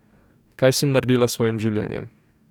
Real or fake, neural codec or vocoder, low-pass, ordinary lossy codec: fake; codec, 44.1 kHz, 2.6 kbps, DAC; 19.8 kHz; none